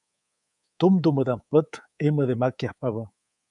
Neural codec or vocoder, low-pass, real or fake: codec, 24 kHz, 3.1 kbps, DualCodec; 10.8 kHz; fake